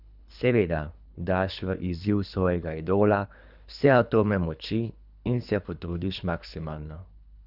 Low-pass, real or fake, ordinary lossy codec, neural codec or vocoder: 5.4 kHz; fake; none; codec, 24 kHz, 3 kbps, HILCodec